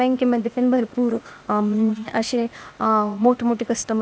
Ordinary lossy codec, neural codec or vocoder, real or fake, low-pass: none; codec, 16 kHz, 0.8 kbps, ZipCodec; fake; none